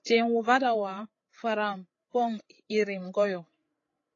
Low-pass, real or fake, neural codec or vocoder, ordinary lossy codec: 7.2 kHz; fake; codec, 16 kHz, 16 kbps, FreqCodec, larger model; AAC, 32 kbps